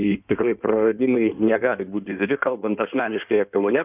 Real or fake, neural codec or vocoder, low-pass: fake; codec, 16 kHz in and 24 kHz out, 1.1 kbps, FireRedTTS-2 codec; 3.6 kHz